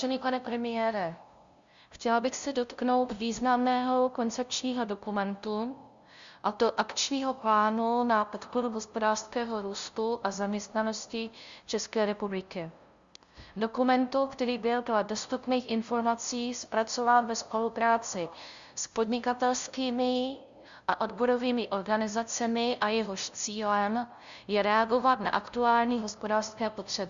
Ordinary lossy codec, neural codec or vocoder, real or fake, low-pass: Opus, 64 kbps; codec, 16 kHz, 0.5 kbps, FunCodec, trained on LibriTTS, 25 frames a second; fake; 7.2 kHz